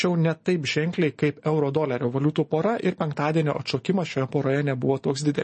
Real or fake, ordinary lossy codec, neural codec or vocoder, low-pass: real; MP3, 32 kbps; none; 10.8 kHz